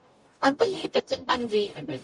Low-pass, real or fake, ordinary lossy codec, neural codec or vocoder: 10.8 kHz; fake; MP3, 64 kbps; codec, 44.1 kHz, 0.9 kbps, DAC